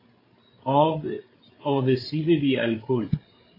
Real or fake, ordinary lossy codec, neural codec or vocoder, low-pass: fake; AAC, 24 kbps; codec, 16 kHz, 8 kbps, FreqCodec, larger model; 5.4 kHz